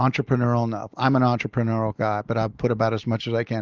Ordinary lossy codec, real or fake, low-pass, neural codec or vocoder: Opus, 32 kbps; real; 7.2 kHz; none